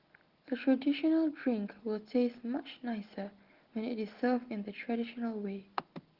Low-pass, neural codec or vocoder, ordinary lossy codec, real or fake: 5.4 kHz; none; Opus, 16 kbps; real